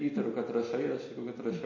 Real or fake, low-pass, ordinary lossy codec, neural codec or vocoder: real; 7.2 kHz; MP3, 32 kbps; none